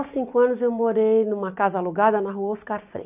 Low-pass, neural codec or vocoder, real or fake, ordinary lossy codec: 3.6 kHz; none; real; none